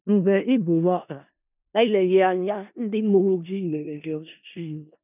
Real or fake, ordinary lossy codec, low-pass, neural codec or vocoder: fake; none; 3.6 kHz; codec, 16 kHz in and 24 kHz out, 0.4 kbps, LongCat-Audio-Codec, four codebook decoder